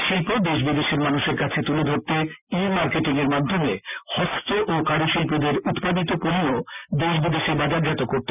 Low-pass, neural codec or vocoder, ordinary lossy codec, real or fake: 3.6 kHz; none; none; real